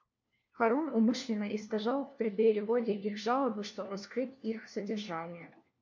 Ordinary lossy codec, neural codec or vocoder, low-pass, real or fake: MP3, 48 kbps; codec, 16 kHz, 1 kbps, FunCodec, trained on LibriTTS, 50 frames a second; 7.2 kHz; fake